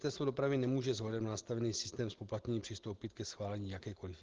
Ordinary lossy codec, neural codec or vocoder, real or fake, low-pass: Opus, 16 kbps; none; real; 7.2 kHz